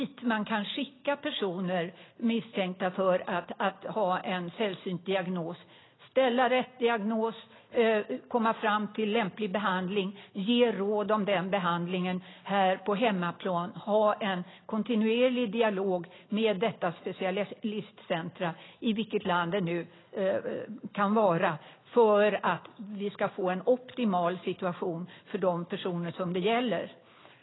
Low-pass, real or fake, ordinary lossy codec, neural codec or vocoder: 7.2 kHz; real; AAC, 16 kbps; none